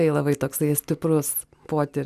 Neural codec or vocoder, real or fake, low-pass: none; real; 14.4 kHz